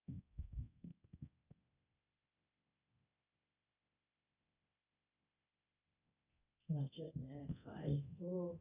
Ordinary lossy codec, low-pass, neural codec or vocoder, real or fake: none; 3.6 kHz; codec, 24 kHz, 0.9 kbps, DualCodec; fake